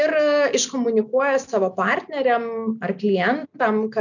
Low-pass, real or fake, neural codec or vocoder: 7.2 kHz; real; none